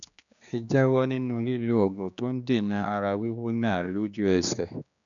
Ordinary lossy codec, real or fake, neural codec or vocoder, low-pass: none; fake; codec, 16 kHz, 2 kbps, X-Codec, HuBERT features, trained on general audio; 7.2 kHz